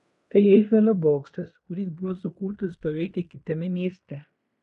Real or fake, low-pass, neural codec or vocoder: fake; 10.8 kHz; codec, 16 kHz in and 24 kHz out, 0.9 kbps, LongCat-Audio-Codec, fine tuned four codebook decoder